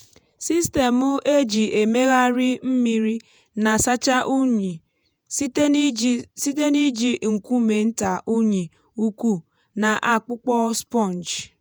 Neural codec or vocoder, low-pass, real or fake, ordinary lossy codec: vocoder, 48 kHz, 128 mel bands, Vocos; none; fake; none